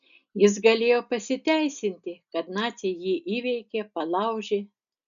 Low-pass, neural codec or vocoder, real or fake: 7.2 kHz; none; real